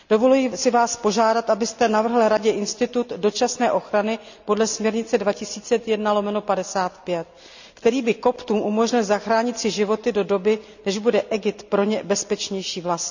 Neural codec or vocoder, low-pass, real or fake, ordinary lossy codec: none; 7.2 kHz; real; none